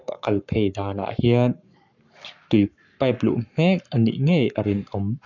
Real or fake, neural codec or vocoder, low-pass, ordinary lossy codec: fake; codec, 44.1 kHz, 7.8 kbps, Pupu-Codec; 7.2 kHz; none